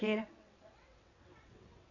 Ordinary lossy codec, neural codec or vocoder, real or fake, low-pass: none; vocoder, 22.05 kHz, 80 mel bands, WaveNeXt; fake; 7.2 kHz